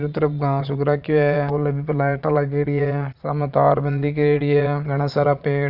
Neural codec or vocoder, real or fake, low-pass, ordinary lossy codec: vocoder, 22.05 kHz, 80 mel bands, Vocos; fake; 5.4 kHz; none